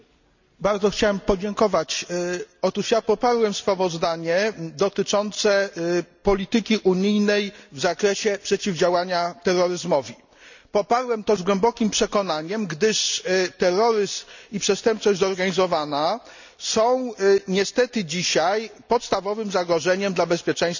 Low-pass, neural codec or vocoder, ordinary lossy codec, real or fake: 7.2 kHz; none; none; real